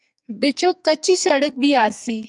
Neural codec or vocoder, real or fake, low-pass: codec, 44.1 kHz, 2.6 kbps, SNAC; fake; 10.8 kHz